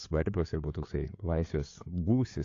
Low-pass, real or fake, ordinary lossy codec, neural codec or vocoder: 7.2 kHz; fake; AAC, 48 kbps; codec, 16 kHz, 4 kbps, FreqCodec, larger model